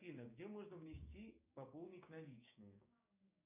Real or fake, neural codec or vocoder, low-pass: fake; codec, 16 kHz, 6 kbps, DAC; 3.6 kHz